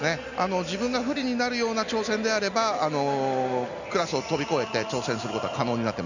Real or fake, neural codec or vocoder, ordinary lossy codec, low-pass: real; none; none; 7.2 kHz